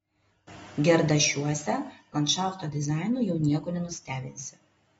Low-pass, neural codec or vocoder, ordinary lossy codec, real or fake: 19.8 kHz; none; AAC, 24 kbps; real